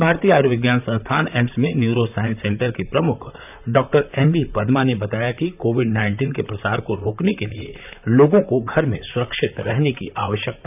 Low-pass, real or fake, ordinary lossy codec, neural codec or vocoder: 3.6 kHz; fake; none; vocoder, 44.1 kHz, 128 mel bands, Pupu-Vocoder